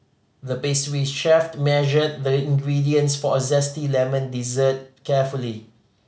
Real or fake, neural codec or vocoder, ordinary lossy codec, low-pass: real; none; none; none